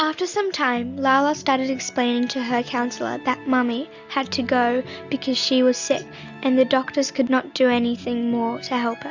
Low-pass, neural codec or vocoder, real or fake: 7.2 kHz; none; real